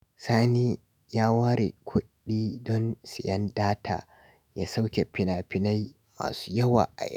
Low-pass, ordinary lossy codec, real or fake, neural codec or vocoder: 19.8 kHz; none; fake; autoencoder, 48 kHz, 128 numbers a frame, DAC-VAE, trained on Japanese speech